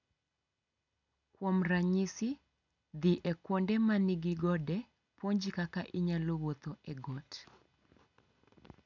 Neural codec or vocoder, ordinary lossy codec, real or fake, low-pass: none; none; real; 7.2 kHz